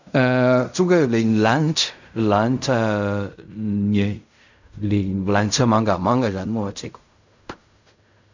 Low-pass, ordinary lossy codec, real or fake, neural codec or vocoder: 7.2 kHz; none; fake; codec, 16 kHz in and 24 kHz out, 0.4 kbps, LongCat-Audio-Codec, fine tuned four codebook decoder